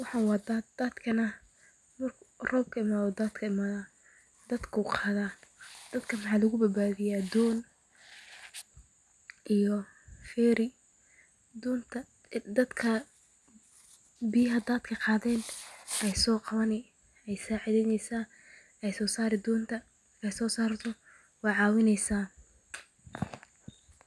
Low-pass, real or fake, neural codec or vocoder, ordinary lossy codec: none; real; none; none